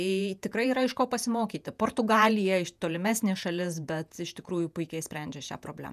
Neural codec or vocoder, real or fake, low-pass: vocoder, 48 kHz, 128 mel bands, Vocos; fake; 14.4 kHz